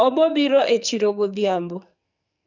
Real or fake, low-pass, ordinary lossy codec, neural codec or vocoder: fake; 7.2 kHz; none; codec, 44.1 kHz, 2.6 kbps, SNAC